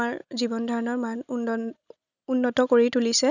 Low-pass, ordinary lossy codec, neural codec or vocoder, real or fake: 7.2 kHz; none; none; real